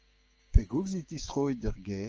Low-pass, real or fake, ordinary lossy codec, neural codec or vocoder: 7.2 kHz; fake; Opus, 24 kbps; codec, 16 kHz, 6 kbps, DAC